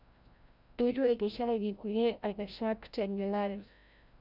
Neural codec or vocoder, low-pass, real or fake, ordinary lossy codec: codec, 16 kHz, 0.5 kbps, FreqCodec, larger model; 5.4 kHz; fake; none